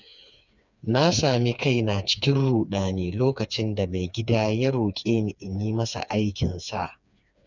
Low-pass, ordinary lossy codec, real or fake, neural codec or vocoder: 7.2 kHz; none; fake; codec, 16 kHz, 4 kbps, FreqCodec, smaller model